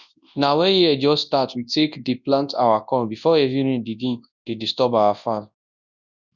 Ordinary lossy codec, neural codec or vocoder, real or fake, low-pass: none; codec, 24 kHz, 0.9 kbps, WavTokenizer, large speech release; fake; 7.2 kHz